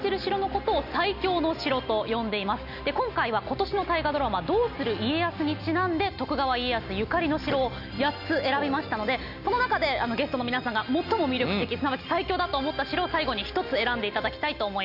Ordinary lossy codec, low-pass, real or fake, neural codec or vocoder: none; 5.4 kHz; real; none